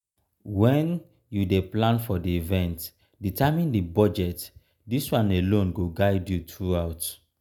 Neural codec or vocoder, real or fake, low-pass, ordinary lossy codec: none; real; none; none